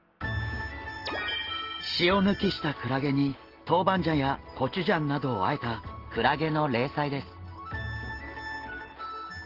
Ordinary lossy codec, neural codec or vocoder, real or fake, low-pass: Opus, 16 kbps; none; real; 5.4 kHz